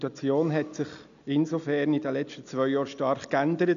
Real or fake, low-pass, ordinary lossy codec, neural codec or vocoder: real; 7.2 kHz; none; none